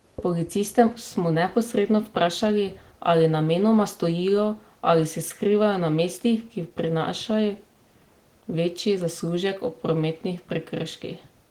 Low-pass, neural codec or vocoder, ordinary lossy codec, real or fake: 19.8 kHz; none; Opus, 16 kbps; real